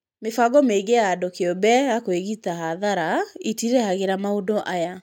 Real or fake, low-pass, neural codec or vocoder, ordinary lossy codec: real; 19.8 kHz; none; none